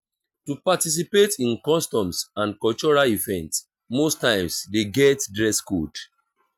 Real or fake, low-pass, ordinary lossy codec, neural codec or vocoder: real; 19.8 kHz; none; none